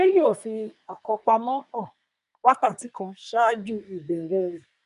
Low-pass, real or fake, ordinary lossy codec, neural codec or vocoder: 10.8 kHz; fake; none; codec, 24 kHz, 1 kbps, SNAC